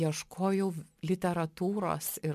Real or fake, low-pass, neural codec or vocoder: real; 14.4 kHz; none